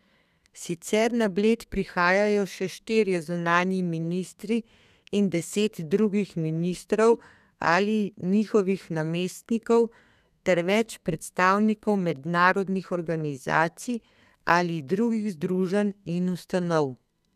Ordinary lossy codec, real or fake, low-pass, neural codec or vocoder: none; fake; 14.4 kHz; codec, 32 kHz, 1.9 kbps, SNAC